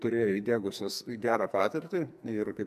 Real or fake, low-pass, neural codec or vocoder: fake; 14.4 kHz; codec, 44.1 kHz, 2.6 kbps, SNAC